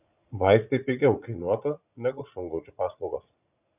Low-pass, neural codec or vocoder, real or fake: 3.6 kHz; vocoder, 44.1 kHz, 80 mel bands, Vocos; fake